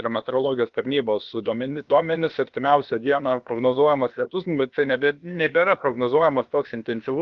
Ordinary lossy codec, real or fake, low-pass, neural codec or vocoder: Opus, 32 kbps; fake; 7.2 kHz; codec, 16 kHz, about 1 kbps, DyCAST, with the encoder's durations